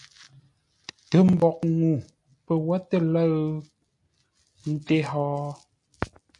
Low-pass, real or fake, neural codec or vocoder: 10.8 kHz; real; none